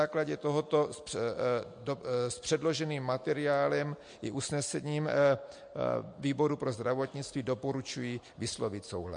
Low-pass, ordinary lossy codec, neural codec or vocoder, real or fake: 9.9 kHz; MP3, 48 kbps; none; real